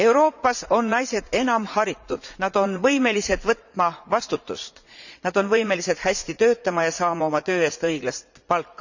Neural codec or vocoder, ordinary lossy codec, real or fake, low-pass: vocoder, 44.1 kHz, 80 mel bands, Vocos; none; fake; 7.2 kHz